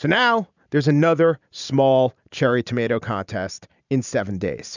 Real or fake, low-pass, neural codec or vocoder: fake; 7.2 kHz; vocoder, 44.1 kHz, 128 mel bands every 512 samples, BigVGAN v2